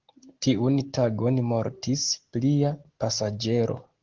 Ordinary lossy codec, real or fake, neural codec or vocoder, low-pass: Opus, 16 kbps; real; none; 7.2 kHz